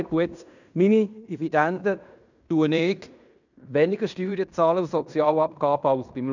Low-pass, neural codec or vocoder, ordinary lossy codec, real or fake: 7.2 kHz; codec, 16 kHz in and 24 kHz out, 0.9 kbps, LongCat-Audio-Codec, fine tuned four codebook decoder; none; fake